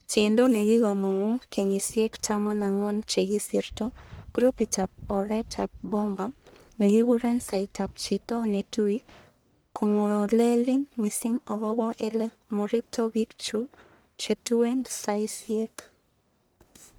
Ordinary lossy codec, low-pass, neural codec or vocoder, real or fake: none; none; codec, 44.1 kHz, 1.7 kbps, Pupu-Codec; fake